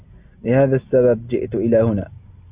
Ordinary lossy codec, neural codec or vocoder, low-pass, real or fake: Opus, 64 kbps; none; 3.6 kHz; real